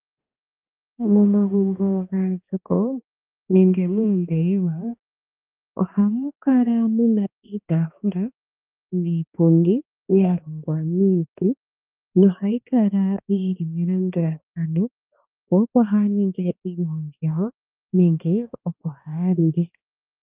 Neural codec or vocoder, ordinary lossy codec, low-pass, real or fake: codec, 16 kHz, 2 kbps, X-Codec, HuBERT features, trained on balanced general audio; Opus, 24 kbps; 3.6 kHz; fake